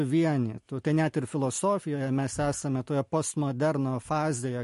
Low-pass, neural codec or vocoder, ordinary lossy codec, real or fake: 14.4 kHz; none; MP3, 48 kbps; real